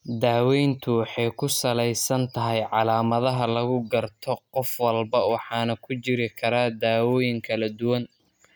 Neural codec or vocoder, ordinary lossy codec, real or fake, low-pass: none; none; real; none